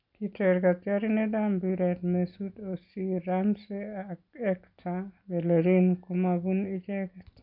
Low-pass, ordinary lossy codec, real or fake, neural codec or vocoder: 5.4 kHz; none; real; none